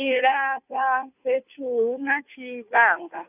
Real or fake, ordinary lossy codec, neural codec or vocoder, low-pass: fake; none; codec, 16 kHz, 2 kbps, FunCodec, trained on Chinese and English, 25 frames a second; 3.6 kHz